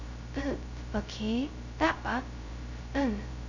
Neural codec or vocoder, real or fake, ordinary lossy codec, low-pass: codec, 16 kHz, 0.2 kbps, FocalCodec; fake; none; 7.2 kHz